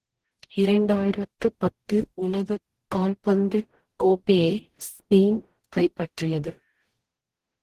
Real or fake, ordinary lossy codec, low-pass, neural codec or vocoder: fake; Opus, 16 kbps; 14.4 kHz; codec, 44.1 kHz, 0.9 kbps, DAC